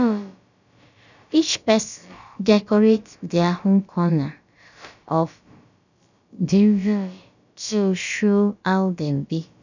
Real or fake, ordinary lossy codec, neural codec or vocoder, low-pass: fake; none; codec, 16 kHz, about 1 kbps, DyCAST, with the encoder's durations; 7.2 kHz